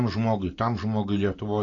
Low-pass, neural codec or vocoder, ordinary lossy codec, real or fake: 7.2 kHz; none; AAC, 32 kbps; real